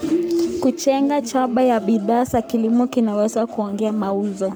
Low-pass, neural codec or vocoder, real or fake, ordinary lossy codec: none; codec, 44.1 kHz, 7.8 kbps, Pupu-Codec; fake; none